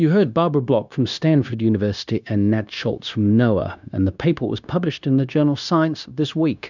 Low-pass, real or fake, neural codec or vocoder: 7.2 kHz; fake; codec, 16 kHz, 0.9 kbps, LongCat-Audio-Codec